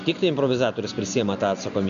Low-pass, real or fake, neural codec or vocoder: 7.2 kHz; real; none